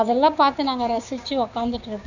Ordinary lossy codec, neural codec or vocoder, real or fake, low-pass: none; codec, 16 kHz, 6 kbps, DAC; fake; 7.2 kHz